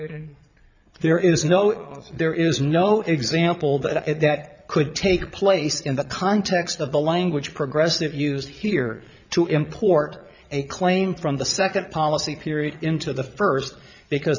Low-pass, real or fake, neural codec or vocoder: 7.2 kHz; fake; vocoder, 22.05 kHz, 80 mel bands, Vocos